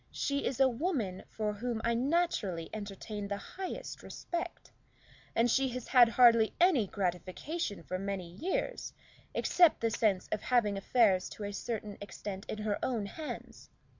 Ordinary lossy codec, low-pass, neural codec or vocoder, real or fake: MP3, 64 kbps; 7.2 kHz; none; real